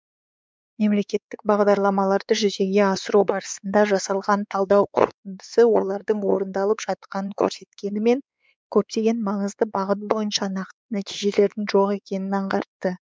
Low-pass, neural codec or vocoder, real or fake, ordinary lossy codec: none; codec, 16 kHz, 4 kbps, X-Codec, WavLM features, trained on Multilingual LibriSpeech; fake; none